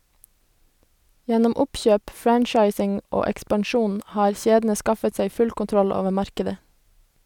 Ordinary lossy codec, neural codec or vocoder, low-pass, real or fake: none; none; 19.8 kHz; real